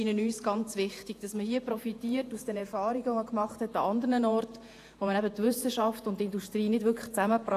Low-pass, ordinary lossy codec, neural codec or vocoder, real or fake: 14.4 kHz; AAC, 64 kbps; vocoder, 48 kHz, 128 mel bands, Vocos; fake